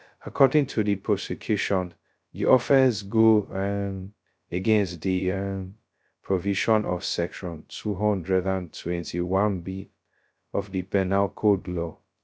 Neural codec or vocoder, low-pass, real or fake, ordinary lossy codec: codec, 16 kHz, 0.2 kbps, FocalCodec; none; fake; none